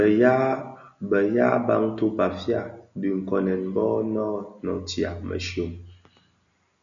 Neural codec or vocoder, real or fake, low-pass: none; real; 7.2 kHz